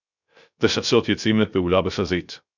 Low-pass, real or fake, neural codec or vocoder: 7.2 kHz; fake; codec, 16 kHz, 0.3 kbps, FocalCodec